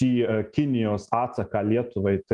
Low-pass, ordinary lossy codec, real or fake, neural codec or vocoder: 10.8 kHz; Opus, 32 kbps; real; none